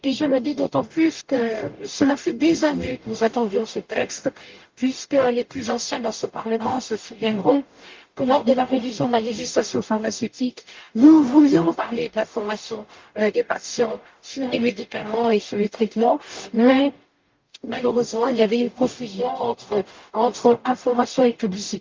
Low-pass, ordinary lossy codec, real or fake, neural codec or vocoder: 7.2 kHz; Opus, 24 kbps; fake; codec, 44.1 kHz, 0.9 kbps, DAC